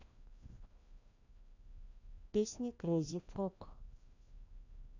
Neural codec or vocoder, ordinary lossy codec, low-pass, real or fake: codec, 16 kHz, 1 kbps, FreqCodec, larger model; none; 7.2 kHz; fake